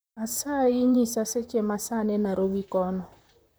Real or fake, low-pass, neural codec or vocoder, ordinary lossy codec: fake; none; vocoder, 44.1 kHz, 128 mel bands, Pupu-Vocoder; none